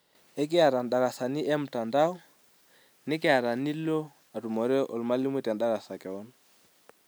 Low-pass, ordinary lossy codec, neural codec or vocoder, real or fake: none; none; none; real